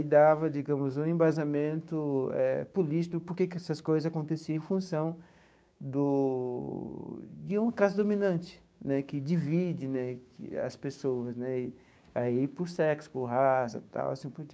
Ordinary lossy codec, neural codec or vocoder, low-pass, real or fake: none; codec, 16 kHz, 6 kbps, DAC; none; fake